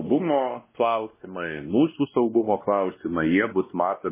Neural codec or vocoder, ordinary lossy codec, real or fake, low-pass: codec, 16 kHz, 1 kbps, X-Codec, WavLM features, trained on Multilingual LibriSpeech; MP3, 16 kbps; fake; 3.6 kHz